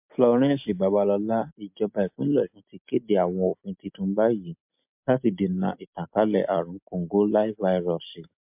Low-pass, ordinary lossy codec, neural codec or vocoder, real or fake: 3.6 kHz; MP3, 32 kbps; none; real